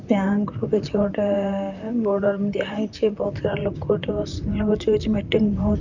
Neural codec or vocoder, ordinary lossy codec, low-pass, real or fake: vocoder, 44.1 kHz, 128 mel bands, Pupu-Vocoder; none; 7.2 kHz; fake